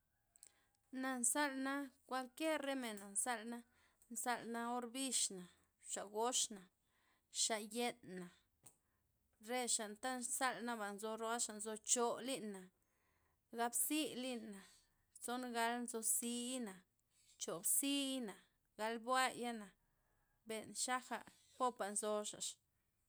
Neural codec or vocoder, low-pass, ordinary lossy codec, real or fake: none; none; none; real